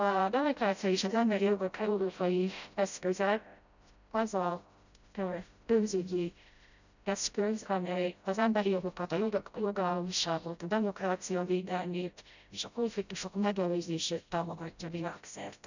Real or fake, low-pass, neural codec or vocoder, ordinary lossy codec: fake; 7.2 kHz; codec, 16 kHz, 0.5 kbps, FreqCodec, smaller model; none